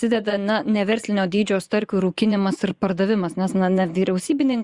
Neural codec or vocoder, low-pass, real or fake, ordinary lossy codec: vocoder, 24 kHz, 100 mel bands, Vocos; 10.8 kHz; fake; Opus, 64 kbps